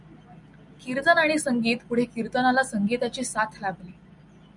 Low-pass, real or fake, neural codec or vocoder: 10.8 kHz; real; none